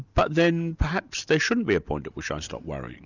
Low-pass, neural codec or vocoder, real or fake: 7.2 kHz; none; real